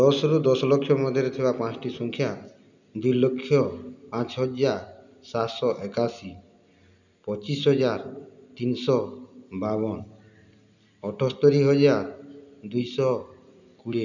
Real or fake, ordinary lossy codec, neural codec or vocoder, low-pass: real; none; none; 7.2 kHz